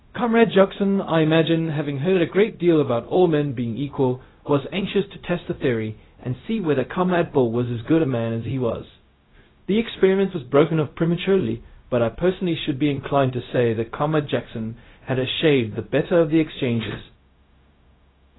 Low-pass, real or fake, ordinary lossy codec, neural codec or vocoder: 7.2 kHz; fake; AAC, 16 kbps; codec, 16 kHz, 0.4 kbps, LongCat-Audio-Codec